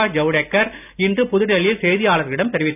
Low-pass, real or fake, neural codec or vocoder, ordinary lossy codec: 3.6 kHz; real; none; none